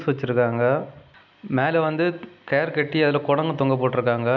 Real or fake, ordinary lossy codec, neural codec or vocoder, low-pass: real; none; none; 7.2 kHz